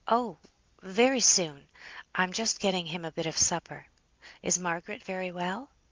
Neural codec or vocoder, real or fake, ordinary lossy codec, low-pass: none; real; Opus, 24 kbps; 7.2 kHz